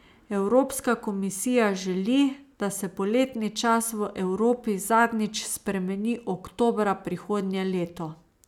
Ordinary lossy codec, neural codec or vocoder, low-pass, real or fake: none; none; 19.8 kHz; real